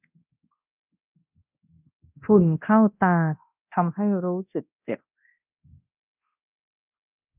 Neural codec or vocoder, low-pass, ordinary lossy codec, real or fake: codec, 24 kHz, 0.9 kbps, DualCodec; 3.6 kHz; none; fake